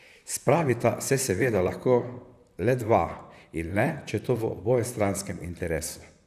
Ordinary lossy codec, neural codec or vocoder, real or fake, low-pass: none; vocoder, 44.1 kHz, 128 mel bands, Pupu-Vocoder; fake; 14.4 kHz